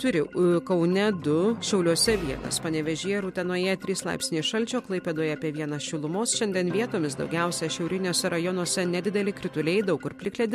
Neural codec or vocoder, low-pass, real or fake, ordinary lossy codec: none; 14.4 kHz; real; MP3, 64 kbps